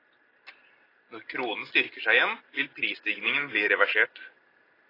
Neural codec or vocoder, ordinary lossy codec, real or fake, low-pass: none; AAC, 24 kbps; real; 5.4 kHz